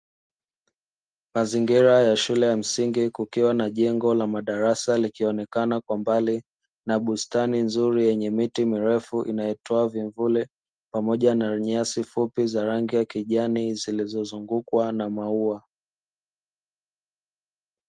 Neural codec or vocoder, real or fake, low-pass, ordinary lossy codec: none; real; 9.9 kHz; Opus, 24 kbps